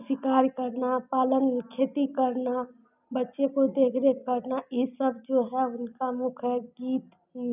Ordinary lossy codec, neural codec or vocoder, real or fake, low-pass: none; none; real; 3.6 kHz